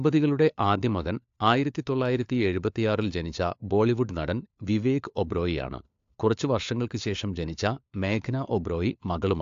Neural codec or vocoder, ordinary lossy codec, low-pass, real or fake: codec, 16 kHz, 4 kbps, FunCodec, trained on LibriTTS, 50 frames a second; AAC, 64 kbps; 7.2 kHz; fake